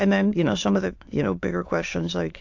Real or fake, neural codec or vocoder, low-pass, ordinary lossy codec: fake; autoencoder, 22.05 kHz, a latent of 192 numbers a frame, VITS, trained on many speakers; 7.2 kHz; AAC, 48 kbps